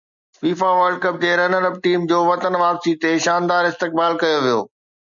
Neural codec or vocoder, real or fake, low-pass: none; real; 7.2 kHz